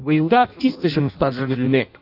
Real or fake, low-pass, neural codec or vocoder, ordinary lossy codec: fake; 5.4 kHz; codec, 16 kHz in and 24 kHz out, 0.6 kbps, FireRedTTS-2 codec; none